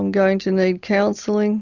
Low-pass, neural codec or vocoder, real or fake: 7.2 kHz; none; real